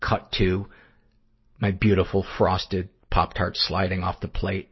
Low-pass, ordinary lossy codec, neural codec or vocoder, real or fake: 7.2 kHz; MP3, 24 kbps; none; real